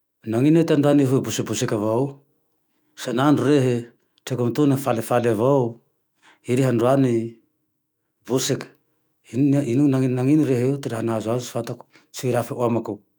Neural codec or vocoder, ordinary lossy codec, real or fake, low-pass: autoencoder, 48 kHz, 128 numbers a frame, DAC-VAE, trained on Japanese speech; none; fake; none